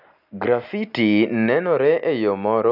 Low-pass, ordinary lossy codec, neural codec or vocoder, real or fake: 5.4 kHz; none; none; real